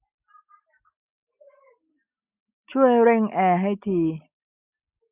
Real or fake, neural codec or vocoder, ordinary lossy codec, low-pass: real; none; none; 3.6 kHz